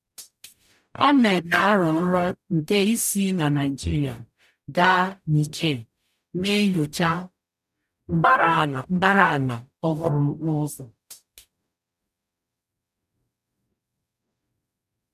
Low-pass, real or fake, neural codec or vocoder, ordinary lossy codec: 14.4 kHz; fake; codec, 44.1 kHz, 0.9 kbps, DAC; none